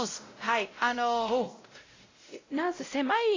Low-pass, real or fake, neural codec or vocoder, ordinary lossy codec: 7.2 kHz; fake; codec, 16 kHz, 0.5 kbps, X-Codec, WavLM features, trained on Multilingual LibriSpeech; AAC, 32 kbps